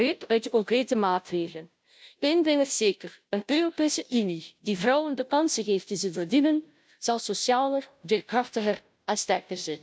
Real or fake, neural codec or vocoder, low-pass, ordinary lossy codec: fake; codec, 16 kHz, 0.5 kbps, FunCodec, trained on Chinese and English, 25 frames a second; none; none